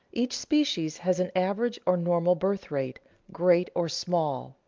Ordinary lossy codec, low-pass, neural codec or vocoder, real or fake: Opus, 32 kbps; 7.2 kHz; none; real